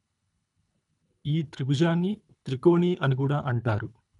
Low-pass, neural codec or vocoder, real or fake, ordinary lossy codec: 10.8 kHz; codec, 24 kHz, 3 kbps, HILCodec; fake; none